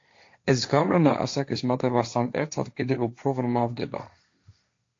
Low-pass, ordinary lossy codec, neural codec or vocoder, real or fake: 7.2 kHz; AAC, 48 kbps; codec, 16 kHz, 1.1 kbps, Voila-Tokenizer; fake